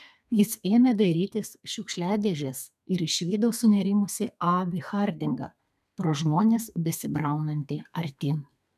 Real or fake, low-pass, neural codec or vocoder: fake; 14.4 kHz; codec, 32 kHz, 1.9 kbps, SNAC